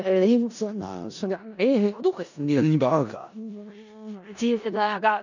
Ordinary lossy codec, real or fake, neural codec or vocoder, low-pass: none; fake; codec, 16 kHz in and 24 kHz out, 0.4 kbps, LongCat-Audio-Codec, four codebook decoder; 7.2 kHz